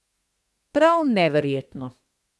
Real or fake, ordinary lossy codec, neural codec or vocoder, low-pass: fake; none; codec, 24 kHz, 1 kbps, SNAC; none